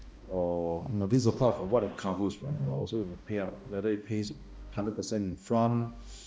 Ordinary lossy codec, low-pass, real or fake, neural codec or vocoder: none; none; fake; codec, 16 kHz, 1 kbps, X-Codec, HuBERT features, trained on balanced general audio